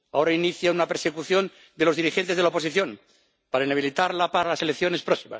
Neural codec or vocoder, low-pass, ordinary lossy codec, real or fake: none; none; none; real